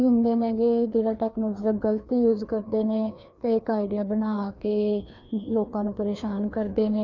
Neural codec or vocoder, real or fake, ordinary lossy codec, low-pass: codec, 16 kHz, 2 kbps, FreqCodec, larger model; fake; none; 7.2 kHz